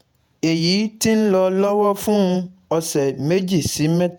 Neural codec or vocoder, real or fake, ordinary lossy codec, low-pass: vocoder, 48 kHz, 128 mel bands, Vocos; fake; none; none